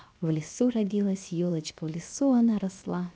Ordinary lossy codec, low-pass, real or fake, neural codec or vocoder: none; none; fake; codec, 16 kHz, 0.7 kbps, FocalCodec